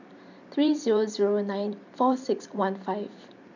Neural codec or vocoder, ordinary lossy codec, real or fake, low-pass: vocoder, 44.1 kHz, 128 mel bands every 512 samples, BigVGAN v2; none; fake; 7.2 kHz